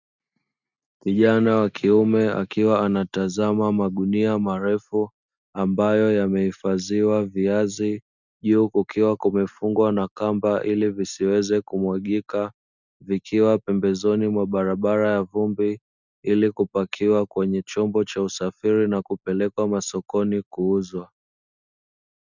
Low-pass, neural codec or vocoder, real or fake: 7.2 kHz; none; real